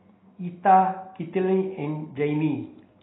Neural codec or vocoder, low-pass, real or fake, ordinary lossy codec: none; 7.2 kHz; real; AAC, 16 kbps